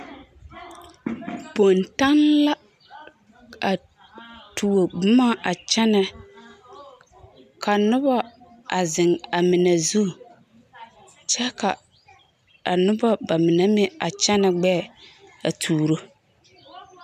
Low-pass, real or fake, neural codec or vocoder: 14.4 kHz; real; none